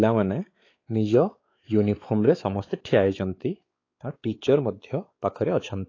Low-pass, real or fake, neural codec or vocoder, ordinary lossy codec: 7.2 kHz; fake; codec, 16 kHz, 4 kbps, X-Codec, WavLM features, trained on Multilingual LibriSpeech; AAC, 32 kbps